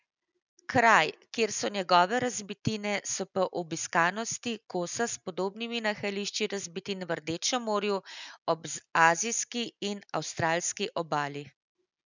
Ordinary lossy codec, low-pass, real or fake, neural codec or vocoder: none; 7.2 kHz; real; none